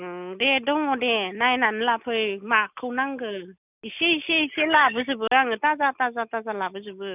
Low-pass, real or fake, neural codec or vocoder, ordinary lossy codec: 3.6 kHz; real; none; none